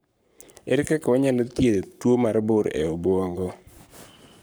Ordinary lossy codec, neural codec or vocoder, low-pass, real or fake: none; codec, 44.1 kHz, 7.8 kbps, Pupu-Codec; none; fake